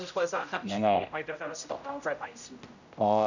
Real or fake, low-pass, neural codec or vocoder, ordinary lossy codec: fake; 7.2 kHz; codec, 16 kHz, 0.5 kbps, X-Codec, HuBERT features, trained on general audio; none